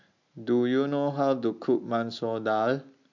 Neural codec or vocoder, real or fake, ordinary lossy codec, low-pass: none; real; MP3, 64 kbps; 7.2 kHz